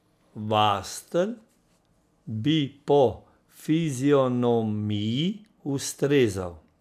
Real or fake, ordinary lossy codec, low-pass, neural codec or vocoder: real; none; 14.4 kHz; none